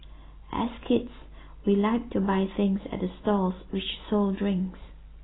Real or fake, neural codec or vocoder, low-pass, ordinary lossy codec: real; none; 7.2 kHz; AAC, 16 kbps